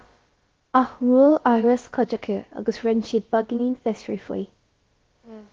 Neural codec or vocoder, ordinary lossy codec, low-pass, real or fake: codec, 16 kHz, about 1 kbps, DyCAST, with the encoder's durations; Opus, 16 kbps; 7.2 kHz; fake